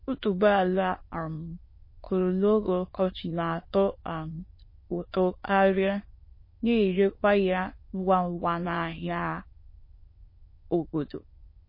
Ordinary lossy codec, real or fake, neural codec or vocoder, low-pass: MP3, 24 kbps; fake; autoencoder, 22.05 kHz, a latent of 192 numbers a frame, VITS, trained on many speakers; 5.4 kHz